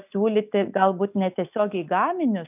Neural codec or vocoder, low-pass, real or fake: none; 3.6 kHz; real